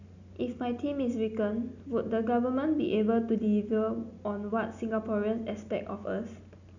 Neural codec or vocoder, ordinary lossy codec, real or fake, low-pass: none; none; real; 7.2 kHz